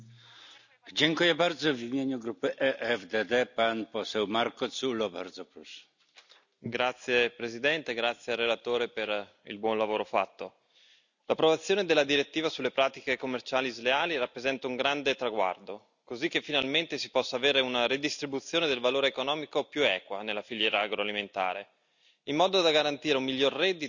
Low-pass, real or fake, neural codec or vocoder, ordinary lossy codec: 7.2 kHz; real; none; none